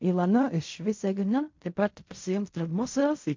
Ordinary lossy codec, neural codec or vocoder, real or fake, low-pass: MP3, 48 kbps; codec, 16 kHz in and 24 kHz out, 0.4 kbps, LongCat-Audio-Codec, fine tuned four codebook decoder; fake; 7.2 kHz